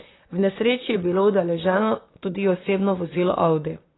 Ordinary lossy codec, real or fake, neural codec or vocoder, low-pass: AAC, 16 kbps; fake; vocoder, 44.1 kHz, 128 mel bands, Pupu-Vocoder; 7.2 kHz